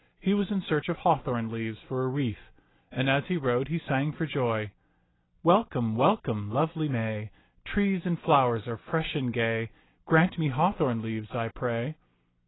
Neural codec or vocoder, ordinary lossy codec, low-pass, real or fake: none; AAC, 16 kbps; 7.2 kHz; real